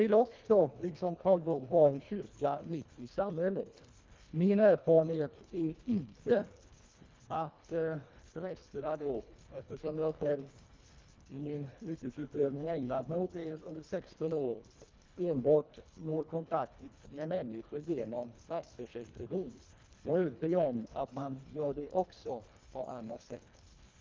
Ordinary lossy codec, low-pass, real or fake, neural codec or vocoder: Opus, 24 kbps; 7.2 kHz; fake; codec, 24 kHz, 1.5 kbps, HILCodec